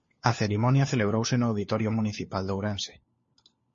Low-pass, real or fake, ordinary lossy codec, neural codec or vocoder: 7.2 kHz; fake; MP3, 32 kbps; codec, 16 kHz, 4 kbps, FunCodec, trained on LibriTTS, 50 frames a second